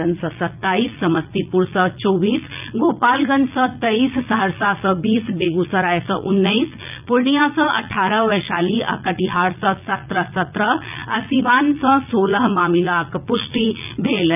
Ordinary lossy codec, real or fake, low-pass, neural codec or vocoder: none; fake; 3.6 kHz; vocoder, 44.1 kHz, 80 mel bands, Vocos